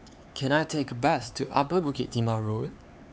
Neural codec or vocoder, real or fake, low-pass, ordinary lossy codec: codec, 16 kHz, 4 kbps, X-Codec, HuBERT features, trained on LibriSpeech; fake; none; none